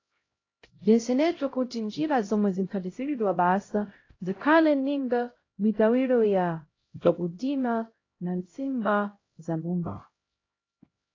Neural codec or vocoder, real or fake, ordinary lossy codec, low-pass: codec, 16 kHz, 0.5 kbps, X-Codec, HuBERT features, trained on LibriSpeech; fake; AAC, 32 kbps; 7.2 kHz